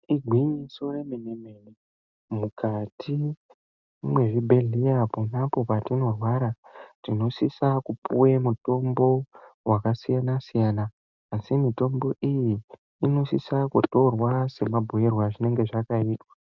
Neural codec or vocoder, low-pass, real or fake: none; 7.2 kHz; real